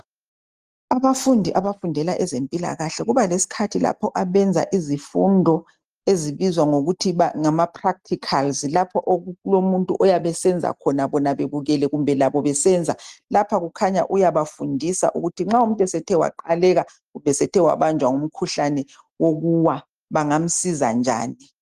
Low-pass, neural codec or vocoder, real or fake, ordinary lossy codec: 14.4 kHz; none; real; Opus, 24 kbps